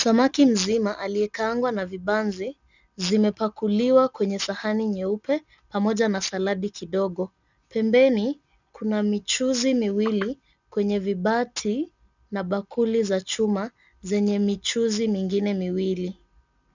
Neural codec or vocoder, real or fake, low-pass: none; real; 7.2 kHz